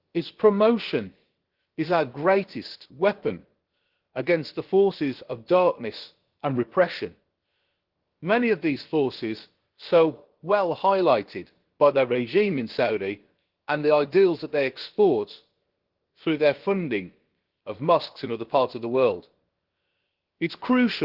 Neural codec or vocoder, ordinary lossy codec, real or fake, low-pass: codec, 16 kHz, about 1 kbps, DyCAST, with the encoder's durations; Opus, 16 kbps; fake; 5.4 kHz